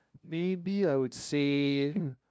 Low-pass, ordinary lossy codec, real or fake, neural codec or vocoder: none; none; fake; codec, 16 kHz, 0.5 kbps, FunCodec, trained on LibriTTS, 25 frames a second